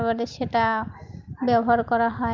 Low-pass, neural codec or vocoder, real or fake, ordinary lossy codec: 7.2 kHz; none; real; Opus, 32 kbps